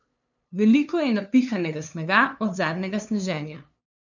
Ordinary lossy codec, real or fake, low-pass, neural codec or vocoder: none; fake; 7.2 kHz; codec, 16 kHz, 2 kbps, FunCodec, trained on LibriTTS, 25 frames a second